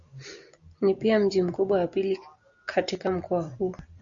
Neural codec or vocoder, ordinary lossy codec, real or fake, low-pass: none; Opus, 64 kbps; real; 7.2 kHz